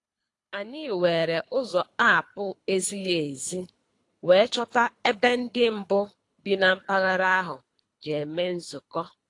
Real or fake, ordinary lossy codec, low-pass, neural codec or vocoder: fake; AAC, 48 kbps; 10.8 kHz; codec, 24 kHz, 3 kbps, HILCodec